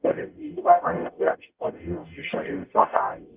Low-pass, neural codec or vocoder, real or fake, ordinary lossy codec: 3.6 kHz; codec, 44.1 kHz, 0.9 kbps, DAC; fake; Opus, 24 kbps